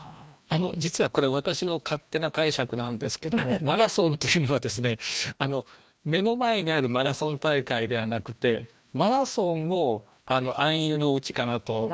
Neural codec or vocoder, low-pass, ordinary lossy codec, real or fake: codec, 16 kHz, 1 kbps, FreqCodec, larger model; none; none; fake